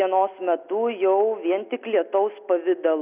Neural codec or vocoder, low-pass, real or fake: none; 3.6 kHz; real